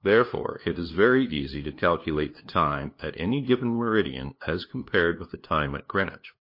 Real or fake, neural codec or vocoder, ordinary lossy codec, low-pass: fake; codec, 16 kHz, 2 kbps, FunCodec, trained on LibriTTS, 25 frames a second; MP3, 32 kbps; 5.4 kHz